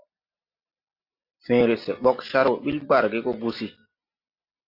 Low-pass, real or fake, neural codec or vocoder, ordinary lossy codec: 5.4 kHz; real; none; AAC, 32 kbps